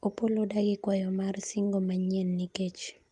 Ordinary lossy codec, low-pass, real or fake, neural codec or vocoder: Opus, 32 kbps; 10.8 kHz; real; none